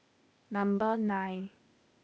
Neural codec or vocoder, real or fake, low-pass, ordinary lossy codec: codec, 16 kHz, 0.8 kbps, ZipCodec; fake; none; none